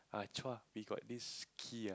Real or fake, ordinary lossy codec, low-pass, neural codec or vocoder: real; none; none; none